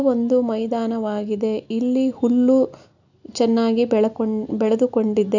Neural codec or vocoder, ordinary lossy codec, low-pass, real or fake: none; none; 7.2 kHz; real